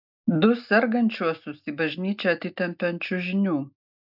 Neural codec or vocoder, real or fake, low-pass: none; real; 5.4 kHz